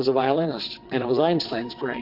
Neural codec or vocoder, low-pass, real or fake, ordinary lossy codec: vocoder, 22.05 kHz, 80 mel bands, WaveNeXt; 5.4 kHz; fake; AAC, 32 kbps